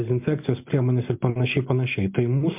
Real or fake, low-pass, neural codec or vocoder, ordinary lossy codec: real; 3.6 kHz; none; MP3, 32 kbps